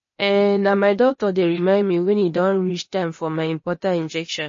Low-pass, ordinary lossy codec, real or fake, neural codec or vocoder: 7.2 kHz; MP3, 32 kbps; fake; codec, 16 kHz, 0.8 kbps, ZipCodec